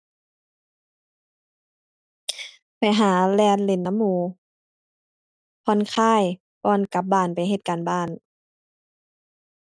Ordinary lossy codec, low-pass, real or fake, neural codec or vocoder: none; none; real; none